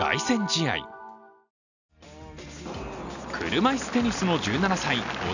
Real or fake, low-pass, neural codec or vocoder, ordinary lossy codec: real; 7.2 kHz; none; none